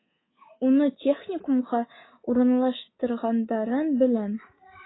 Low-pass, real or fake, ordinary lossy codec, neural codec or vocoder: 7.2 kHz; fake; AAC, 16 kbps; codec, 24 kHz, 3.1 kbps, DualCodec